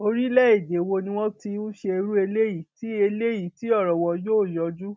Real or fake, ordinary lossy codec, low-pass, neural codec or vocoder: real; none; none; none